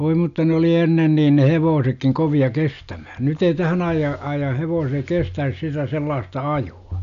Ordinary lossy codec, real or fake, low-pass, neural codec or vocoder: none; real; 7.2 kHz; none